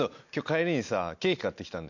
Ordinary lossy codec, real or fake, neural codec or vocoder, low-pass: none; real; none; 7.2 kHz